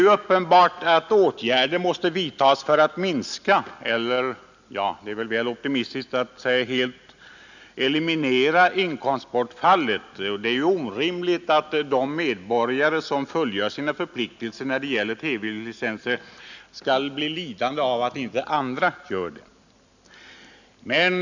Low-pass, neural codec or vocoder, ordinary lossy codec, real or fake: 7.2 kHz; none; none; real